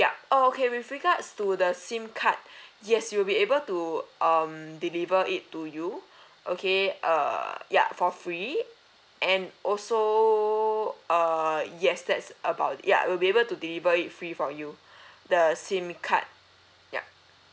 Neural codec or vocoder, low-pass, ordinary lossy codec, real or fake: none; none; none; real